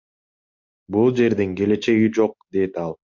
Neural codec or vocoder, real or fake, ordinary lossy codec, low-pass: none; real; MP3, 48 kbps; 7.2 kHz